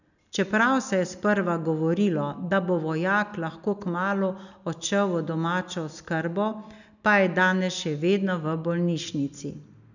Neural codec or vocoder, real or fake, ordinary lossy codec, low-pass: none; real; none; 7.2 kHz